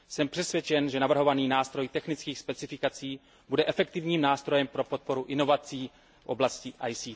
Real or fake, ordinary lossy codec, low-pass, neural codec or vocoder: real; none; none; none